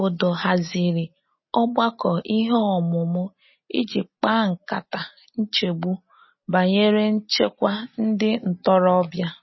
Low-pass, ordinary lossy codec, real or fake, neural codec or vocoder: 7.2 kHz; MP3, 24 kbps; real; none